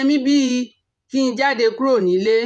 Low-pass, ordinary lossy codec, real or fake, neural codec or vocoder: 10.8 kHz; none; fake; vocoder, 24 kHz, 100 mel bands, Vocos